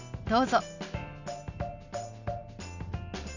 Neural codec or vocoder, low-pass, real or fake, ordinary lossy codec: none; 7.2 kHz; real; none